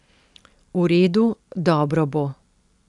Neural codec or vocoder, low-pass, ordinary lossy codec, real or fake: none; 10.8 kHz; none; real